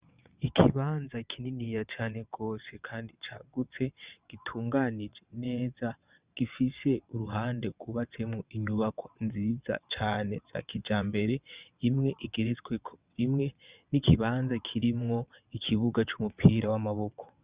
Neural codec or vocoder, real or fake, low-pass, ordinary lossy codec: vocoder, 22.05 kHz, 80 mel bands, WaveNeXt; fake; 3.6 kHz; Opus, 64 kbps